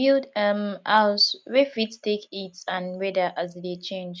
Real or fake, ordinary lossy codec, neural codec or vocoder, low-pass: real; none; none; none